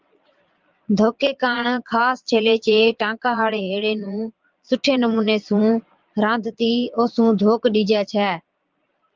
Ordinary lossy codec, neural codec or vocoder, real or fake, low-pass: Opus, 32 kbps; vocoder, 22.05 kHz, 80 mel bands, Vocos; fake; 7.2 kHz